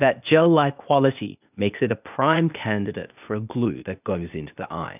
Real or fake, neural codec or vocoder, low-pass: fake; codec, 16 kHz, 0.8 kbps, ZipCodec; 3.6 kHz